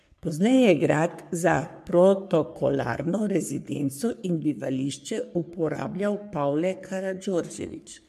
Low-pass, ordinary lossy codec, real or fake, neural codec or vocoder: 14.4 kHz; MP3, 96 kbps; fake; codec, 44.1 kHz, 3.4 kbps, Pupu-Codec